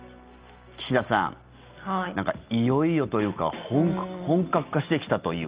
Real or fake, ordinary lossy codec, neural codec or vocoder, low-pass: real; Opus, 24 kbps; none; 3.6 kHz